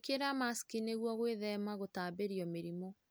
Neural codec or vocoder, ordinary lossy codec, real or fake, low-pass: none; none; real; none